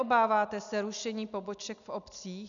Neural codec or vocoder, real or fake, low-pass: none; real; 7.2 kHz